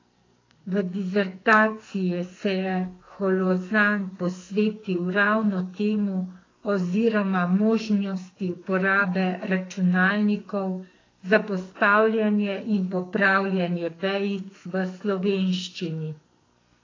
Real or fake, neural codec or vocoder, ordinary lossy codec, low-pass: fake; codec, 44.1 kHz, 2.6 kbps, SNAC; AAC, 32 kbps; 7.2 kHz